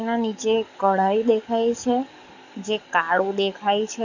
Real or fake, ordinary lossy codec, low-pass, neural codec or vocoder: fake; none; 7.2 kHz; codec, 44.1 kHz, 7.8 kbps, DAC